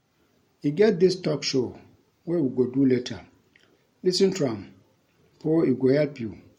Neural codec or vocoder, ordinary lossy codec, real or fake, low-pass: none; MP3, 64 kbps; real; 19.8 kHz